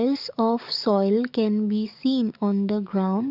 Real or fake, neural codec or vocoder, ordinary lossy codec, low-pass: fake; codec, 44.1 kHz, 7.8 kbps, DAC; none; 5.4 kHz